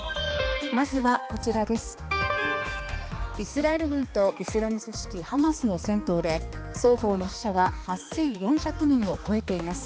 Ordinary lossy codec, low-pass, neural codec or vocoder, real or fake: none; none; codec, 16 kHz, 2 kbps, X-Codec, HuBERT features, trained on balanced general audio; fake